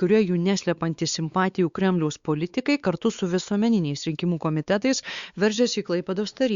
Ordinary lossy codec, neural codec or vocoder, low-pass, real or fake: Opus, 64 kbps; codec, 16 kHz, 4 kbps, X-Codec, WavLM features, trained on Multilingual LibriSpeech; 7.2 kHz; fake